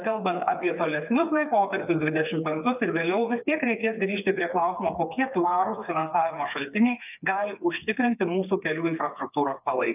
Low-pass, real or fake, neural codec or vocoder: 3.6 kHz; fake; codec, 16 kHz, 4 kbps, FreqCodec, smaller model